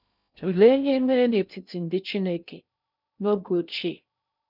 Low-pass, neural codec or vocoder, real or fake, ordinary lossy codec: 5.4 kHz; codec, 16 kHz in and 24 kHz out, 0.6 kbps, FocalCodec, streaming, 2048 codes; fake; none